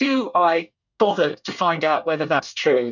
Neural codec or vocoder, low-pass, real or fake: codec, 24 kHz, 1 kbps, SNAC; 7.2 kHz; fake